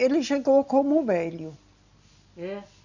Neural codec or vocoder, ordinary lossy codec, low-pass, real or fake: none; none; 7.2 kHz; real